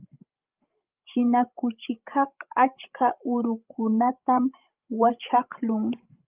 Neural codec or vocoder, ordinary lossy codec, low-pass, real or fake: codec, 16 kHz, 16 kbps, FreqCodec, larger model; Opus, 32 kbps; 3.6 kHz; fake